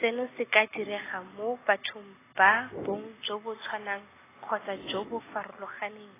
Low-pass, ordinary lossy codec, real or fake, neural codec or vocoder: 3.6 kHz; AAC, 16 kbps; real; none